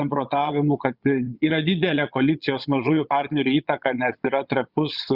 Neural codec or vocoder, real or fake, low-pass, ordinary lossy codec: vocoder, 22.05 kHz, 80 mel bands, Vocos; fake; 5.4 kHz; Opus, 64 kbps